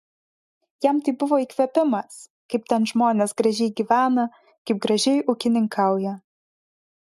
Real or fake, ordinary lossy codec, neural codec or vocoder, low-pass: real; MP3, 96 kbps; none; 14.4 kHz